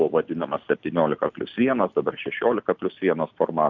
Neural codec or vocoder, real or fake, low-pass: none; real; 7.2 kHz